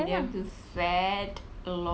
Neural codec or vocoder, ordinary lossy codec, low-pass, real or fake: none; none; none; real